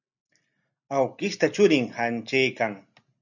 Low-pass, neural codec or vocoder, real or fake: 7.2 kHz; none; real